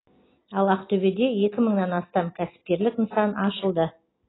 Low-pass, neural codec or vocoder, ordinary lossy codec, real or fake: 7.2 kHz; none; AAC, 16 kbps; real